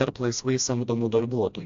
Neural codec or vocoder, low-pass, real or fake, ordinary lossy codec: codec, 16 kHz, 1 kbps, FreqCodec, smaller model; 7.2 kHz; fake; AAC, 64 kbps